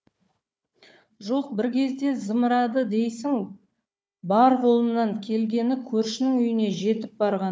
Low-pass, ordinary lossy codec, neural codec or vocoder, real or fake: none; none; codec, 16 kHz, 4 kbps, FunCodec, trained on Chinese and English, 50 frames a second; fake